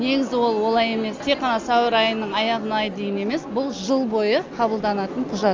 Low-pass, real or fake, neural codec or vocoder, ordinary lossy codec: 7.2 kHz; real; none; Opus, 32 kbps